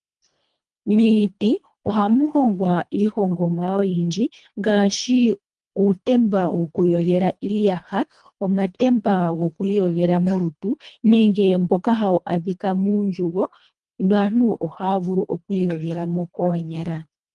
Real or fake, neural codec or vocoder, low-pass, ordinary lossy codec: fake; codec, 24 kHz, 1.5 kbps, HILCodec; 10.8 kHz; Opus, 32 kbps